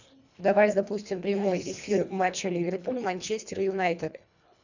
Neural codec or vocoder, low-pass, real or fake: codec, 24 kHz, 1.5 kbps, HILCodec; 7.2 kHz; fake